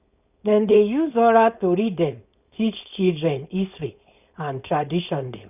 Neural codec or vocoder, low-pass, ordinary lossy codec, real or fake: codec, 16 kHz, 4.8 kbps, FACodec; 3.6 kHz; none; fake